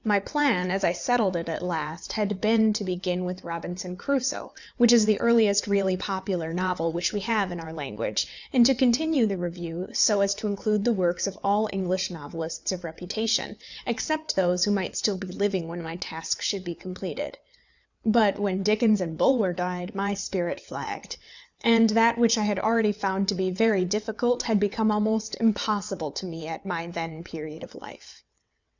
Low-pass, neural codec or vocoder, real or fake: 7.2 kHz; vocoder, 22.05 kHz, 80 mel bands, WaveNeXt; fake